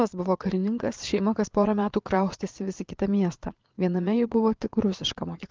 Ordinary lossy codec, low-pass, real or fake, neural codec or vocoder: Opus, 32 kbps; 7.2 kHz; fake; vocoder, 22.05 kHz, 80 mel bands, Vocos